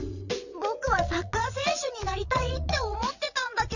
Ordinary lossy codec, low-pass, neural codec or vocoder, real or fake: none; 7.2 kHz; vocoder, 22.05 kHz, 80 mel bands, WaveNeXt; fake